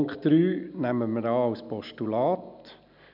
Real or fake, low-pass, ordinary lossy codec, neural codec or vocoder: real; 5.4 kHz; none; none